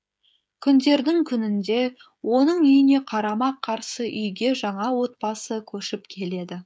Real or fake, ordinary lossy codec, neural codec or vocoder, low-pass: fake; none; codec, 16 kHz, 16 kbps, FreqCodec, smaller model; none